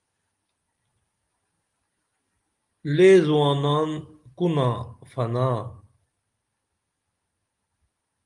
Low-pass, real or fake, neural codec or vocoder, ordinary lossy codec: 10.8 kHz; real; none; Opus, 32 kbps